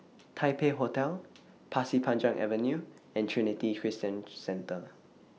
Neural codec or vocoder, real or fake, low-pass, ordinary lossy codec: none; real; none; none